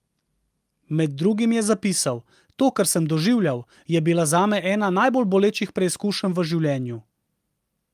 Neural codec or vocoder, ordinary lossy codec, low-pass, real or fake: none; Opus, 32 kbps; 14.4 kHz; real